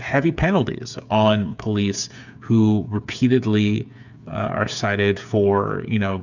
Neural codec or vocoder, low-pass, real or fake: codec, 16 kHz, 8 kbps, FreqCodec, smaller model; 7.2 kHz; fake